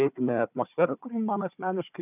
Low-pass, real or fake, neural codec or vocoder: 3.6 kHz; fake; codec, 16 kHz, 4 kbps, FunCodec, trained on Chinese and English, 50 frames a second